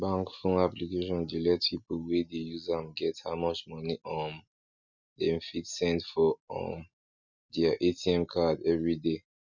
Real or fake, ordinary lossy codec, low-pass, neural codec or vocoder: real; none; 7.2 kHz; none